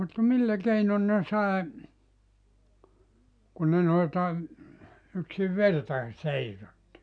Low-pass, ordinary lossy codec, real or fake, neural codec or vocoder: 9.9 kHz; none; real; none